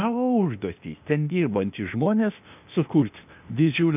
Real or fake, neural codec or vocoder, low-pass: fake; codec, 16 kHz, 0.8 kbps, ZipCodec; 3.6 kHz